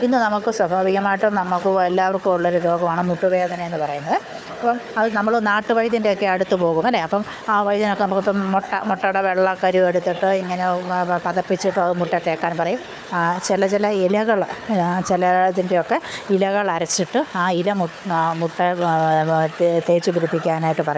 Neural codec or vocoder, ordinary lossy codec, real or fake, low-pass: codec, 16 kHz, 4 kbps, FunCodec, trained on Chinese and English, 50 frames a second; none; fake; none